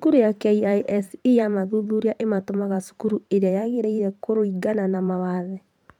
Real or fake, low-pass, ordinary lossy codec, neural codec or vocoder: fake; 19.8 kHz; none; vocoder, 44.1 kHz, 128 mel bands every 512 samples, BigVGAN v2